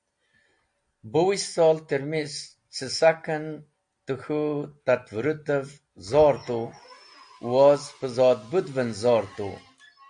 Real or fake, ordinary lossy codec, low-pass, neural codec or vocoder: real; AAC, 64 kbps; 9.9 kHz; none